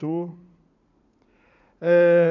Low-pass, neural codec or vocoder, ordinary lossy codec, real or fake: 7.2 kHz; vocoder, 44.1 kHz, 80 mel bands, Vocos; none; fake